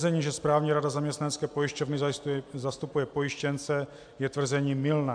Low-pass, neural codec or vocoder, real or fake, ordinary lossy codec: 9.9 kHz; none; real; AAC, 64 kbps